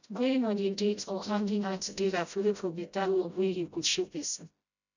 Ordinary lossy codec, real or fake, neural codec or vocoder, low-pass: none; fake; codec, 16 kHz, 0.5 kbps, FreqCodec, smaller model; 7.2 kHz